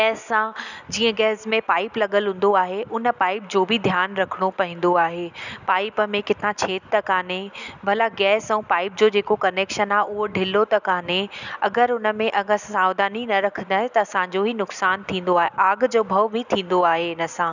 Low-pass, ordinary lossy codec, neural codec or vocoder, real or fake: 7.2 kHz; none; none; real